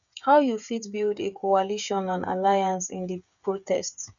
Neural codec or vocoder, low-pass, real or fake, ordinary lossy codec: codec, 16 kHz, 8 kbps, FreqCodec, smaller model; 7.2 kHz; fake; none